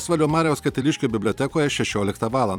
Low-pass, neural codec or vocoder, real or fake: 19.8 kHz; vocoder, 44.1 kHz, 128 mel bands every 256 samples, BigVGAN v2; fake